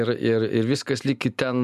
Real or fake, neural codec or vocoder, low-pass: real; none; 14.4 kHz